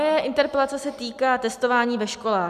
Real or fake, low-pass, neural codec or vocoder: real; 14.4 kHz; none